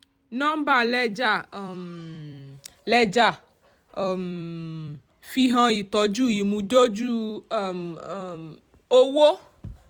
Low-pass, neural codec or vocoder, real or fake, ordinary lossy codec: 19.8 kHz; vocoder, 44.1 kHz, 128 mel bands every 256 samples, BigVGAN v2; fake; none